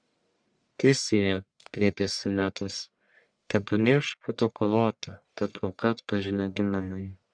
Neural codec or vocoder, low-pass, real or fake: codec, 44.1 kHz, 1.7 kbps, Pupu-Codec; 9.9 kHz; fake